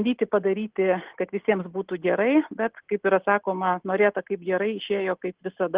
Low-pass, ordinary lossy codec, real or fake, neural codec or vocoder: 3.6 kHz; Opus, 16 kbps; real; none